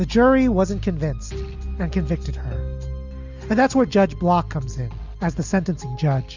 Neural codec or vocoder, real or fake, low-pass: none; real; 7.2 kHz